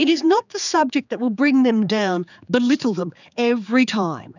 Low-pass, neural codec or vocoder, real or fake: 7.2 kHz; codec, 16 kHz, 4 kbps, X-Codec, HuBERT features, trained on general audio; fake